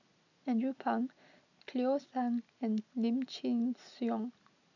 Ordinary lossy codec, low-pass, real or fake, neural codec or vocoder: none; 7.2 kHz; real; none